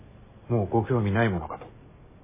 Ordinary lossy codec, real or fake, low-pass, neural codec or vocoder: MP3, 16 kbps; real; 3.6 kHz; none